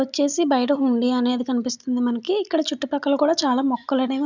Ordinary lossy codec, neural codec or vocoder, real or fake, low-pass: none; none; real; 7.2 kHz